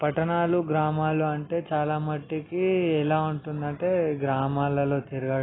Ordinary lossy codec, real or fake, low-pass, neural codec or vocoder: AAC, 16 kbps; real; 7.2 kHz; none